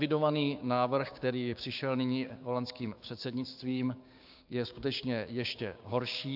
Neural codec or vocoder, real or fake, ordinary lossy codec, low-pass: codec, 16 kHz, 6 kbps, DAC; fake; AAC, 48 kbps; 5.4 kHz